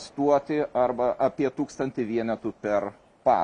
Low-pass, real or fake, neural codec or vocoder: 10.8 kHz; real; none